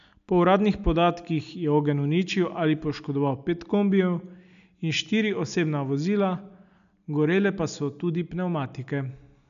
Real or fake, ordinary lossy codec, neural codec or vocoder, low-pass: real; none; none; 7.2 kHz